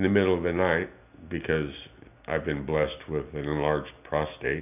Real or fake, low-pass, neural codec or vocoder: real; 3.6 kHz; none